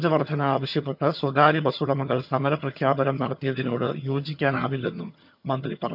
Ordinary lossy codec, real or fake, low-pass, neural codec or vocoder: none; fake; 5.4 kHz; vocoder, 22.05 kHz, 80 mel bands, HiFi-GAN